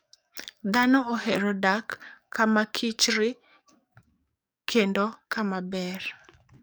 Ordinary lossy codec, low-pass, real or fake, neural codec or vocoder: none; none; fake; codec, 44.1 kHz, 7.8 kbps, DAC